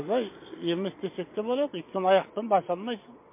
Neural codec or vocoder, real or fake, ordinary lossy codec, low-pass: vocoder, 22.05 kHz, 80 mel bands, Vocos; fake; MP3, 24 kbps; 3.6 kHz